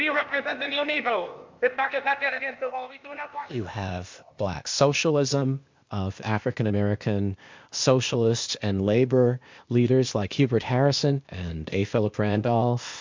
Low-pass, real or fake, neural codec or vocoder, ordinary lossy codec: 7.2 kHz; fake; codec, 16 kHz, 0.8 kbps, ZipCodec; MP3, 64 kbps